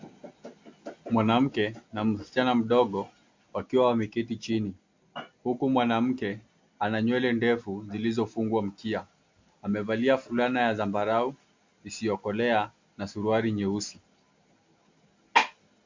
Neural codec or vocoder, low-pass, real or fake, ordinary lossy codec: none; 7.2 kHz; real; MP3, 48 kbps